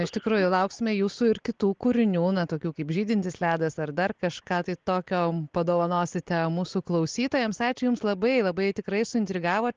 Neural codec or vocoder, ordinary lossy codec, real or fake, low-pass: none; Opus, 16 kbps; real; 7.2 kHz